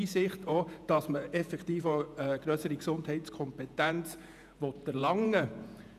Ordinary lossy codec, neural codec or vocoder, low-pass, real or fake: none; vocoder, 48 kHz, 128 mel bands, Vocos; 14.4 kHz; fake